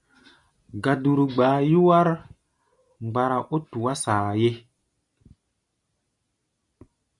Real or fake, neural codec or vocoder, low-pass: real; none; 10.8 kHz